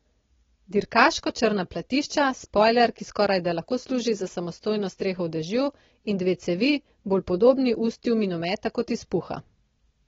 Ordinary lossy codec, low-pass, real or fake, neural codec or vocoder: AAC, 24 kbps; 7.2 kHz; real; none